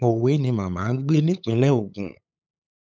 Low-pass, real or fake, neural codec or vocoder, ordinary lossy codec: none; fake; codec, 16 kHz, 8 kbps, FunCodec, trained on LibriTTS, 25 frames a second; none